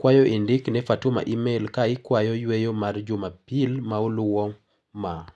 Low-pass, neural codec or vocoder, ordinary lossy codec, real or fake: none; none; none; real